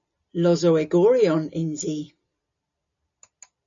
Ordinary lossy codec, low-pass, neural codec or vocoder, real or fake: MP3, 96 kbps; 7.2 kHz; none; real